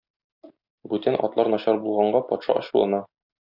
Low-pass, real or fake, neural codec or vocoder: 5.4 kHz; real; none